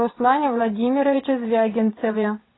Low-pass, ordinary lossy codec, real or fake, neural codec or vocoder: 7.2 kHz; AAC, 16 kbps; fake; vocoder, 22.05 kHz, 80 mel bands, WaveNeXt